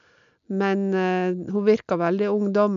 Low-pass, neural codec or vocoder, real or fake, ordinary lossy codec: 7.2 kHz; none; real; MP3, 96 kbps